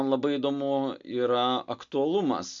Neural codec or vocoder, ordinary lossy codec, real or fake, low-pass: none; MP3, 64 kbps; real; 7.2 kHz